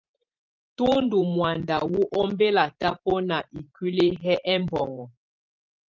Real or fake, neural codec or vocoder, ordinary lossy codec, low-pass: fake; vocoder, 44.1 kHz, 128 mel bands every 512 samples, BigVGAN v2; Opus, 32 kbps; 7.2 kHz